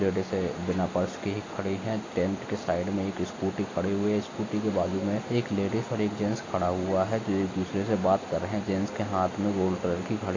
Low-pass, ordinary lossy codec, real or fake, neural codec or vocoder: 7.2 kHz; AAC, 32 kbps; real; none